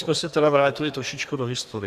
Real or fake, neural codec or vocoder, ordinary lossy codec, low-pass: fake; codec, 32 kHz, 1.9 kbps, SNAC; Opus, 64 kbps; 14.4 kHz